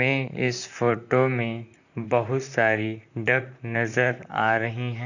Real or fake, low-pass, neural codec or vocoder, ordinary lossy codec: fake; 7.2 kHz; vocoder, 44.1 kHz, 128 mel bands, Pupu-Vocoder; none